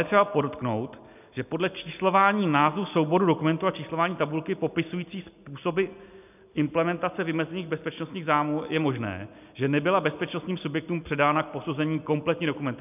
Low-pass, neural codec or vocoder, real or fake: 3.6 kHz; none; real